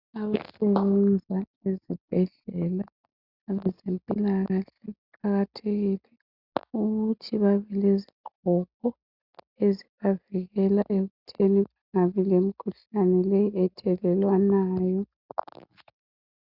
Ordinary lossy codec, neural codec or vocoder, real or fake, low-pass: Opus, 64 kbps; none; real; 5.4 kHz